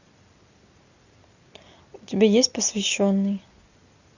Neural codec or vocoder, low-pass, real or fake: none; 7.2 kHz; real